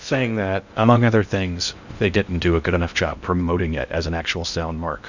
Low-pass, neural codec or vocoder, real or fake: 7.2 kHz; codec, 16 kHz in and 24 kHz out, 0.6 kbps, FocalCodec, streaming, 2048 codes; fake